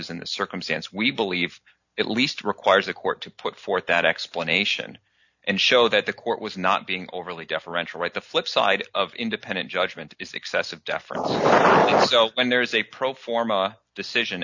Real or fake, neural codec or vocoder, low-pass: real; none; 7.2 kHz